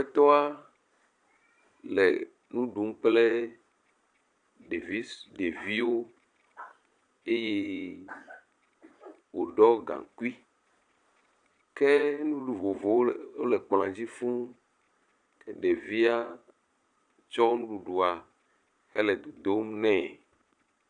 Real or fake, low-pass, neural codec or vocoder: fake; 9.9 kHz; vocoder, 22.05 kHz, 80 mel bands, Vocos